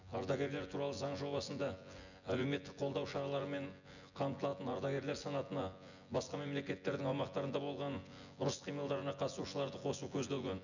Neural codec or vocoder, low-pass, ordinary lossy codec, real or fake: vocoder, 24 kHz, 100 mel bands, Vocos; 7.2 kHz; none; fake